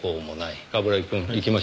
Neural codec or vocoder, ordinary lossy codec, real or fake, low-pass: none; none; real; none